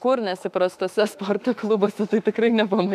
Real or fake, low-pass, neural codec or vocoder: fake; 14.4 kHz; autoencoder, 48 kHz, 32 numbers a frame, DAC-VAE, trained on Japanese speech